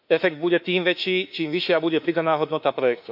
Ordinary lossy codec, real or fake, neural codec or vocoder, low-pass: MP3, 48 kbps; fake; autoencoder, 48 kHz, 32 numbers a frame, DAC-VAE, trained on Japanese speech; 5.4 kHz